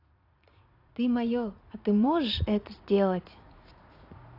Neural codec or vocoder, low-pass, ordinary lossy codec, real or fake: vocoder, 44.1 kHz, 80 mel bands, Vocos; 5.4 kHz; AAC, 32 kbps; fake